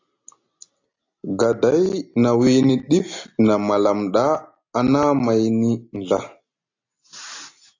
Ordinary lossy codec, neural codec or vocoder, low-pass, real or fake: AAC, 48 kbps; none; 7.2 kHz; real